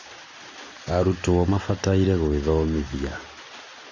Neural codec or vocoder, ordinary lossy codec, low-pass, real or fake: none; Opus, 64 kbps; 7.2 kHz; real